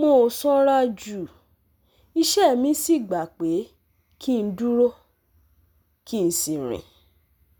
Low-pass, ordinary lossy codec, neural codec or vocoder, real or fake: none; none; none; real